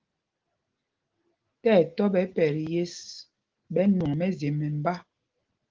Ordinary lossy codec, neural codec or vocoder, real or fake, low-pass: Opus, 16 kbps; none; real; 7.2 kHz